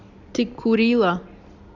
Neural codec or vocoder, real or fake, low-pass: none; real; 7.2 kHz